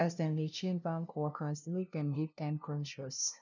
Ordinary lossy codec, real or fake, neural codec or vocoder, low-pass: none; fake; codec, 16 kHz, 0.5 kbps, FunCodec, trained on LibriTTS, 25 frames a second; 7.2 kHz